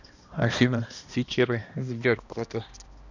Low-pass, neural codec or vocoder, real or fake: 7.2 kHz; codec, 16 kHz, 1 kbps, X-Codec, HuBERT features, trained on balanced general audio; fake